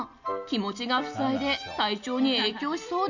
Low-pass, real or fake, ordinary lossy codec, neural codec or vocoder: 7.2 kHz; real; none; none